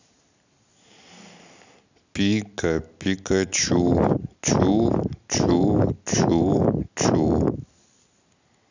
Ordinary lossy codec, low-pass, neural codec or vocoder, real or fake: none; 7.2 kHz; none; real